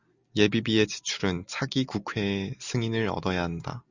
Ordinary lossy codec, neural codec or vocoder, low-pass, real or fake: Opus, 64 kbps; none; 7.2 kHz; real